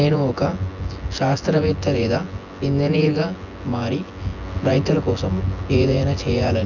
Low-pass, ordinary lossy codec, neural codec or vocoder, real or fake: 7.2 kHz; none; vocoder, 24 kHz, 100 mel bands, Vocos; fake